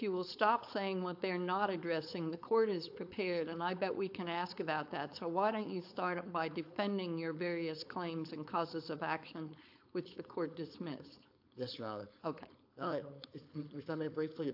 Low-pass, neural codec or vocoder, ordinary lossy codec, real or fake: 5.4 kHz; codec, 16 kHz, 4.8 kbps, FACodec; AAC, 48 kbps; fake